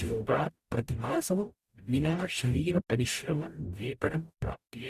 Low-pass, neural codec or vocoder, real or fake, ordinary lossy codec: 14.4 kHz; codec, 44.1 kHz, 0.9 kbps, DAC; fake; Opus, 64 kbps